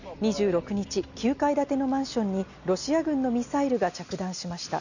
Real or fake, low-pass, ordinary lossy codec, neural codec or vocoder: real; 7.2 kHz; none; none